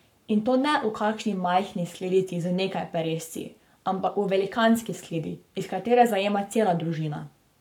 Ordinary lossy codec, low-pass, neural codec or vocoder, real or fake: none; 19.8 kHz; codec, 44.1 kHz, 7.8 kbps, Pupu-Codec; fake